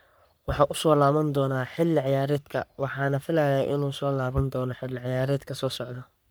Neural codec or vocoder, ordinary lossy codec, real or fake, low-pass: codec, 44.1 kHz, 3.4 kbps, Pupu-Codec; none; fake; none